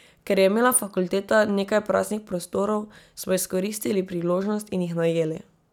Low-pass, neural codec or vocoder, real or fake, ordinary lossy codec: 19.8 kHz; none; real; none